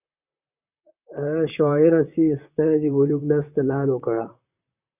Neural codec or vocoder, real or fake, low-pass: vocoder, 44.1 kHz, 128 mel bands, Pupu-Vocoder; fake; 3.6 kHz